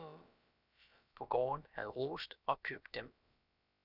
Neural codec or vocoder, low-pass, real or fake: codec, 16 kHz, about 1 kbps, DyCAST, with the encoder's durations; 5.4 kHz; fake